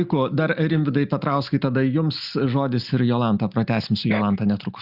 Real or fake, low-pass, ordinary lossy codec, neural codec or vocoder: real; 5.4 kHz; Opus, 64 kbps; none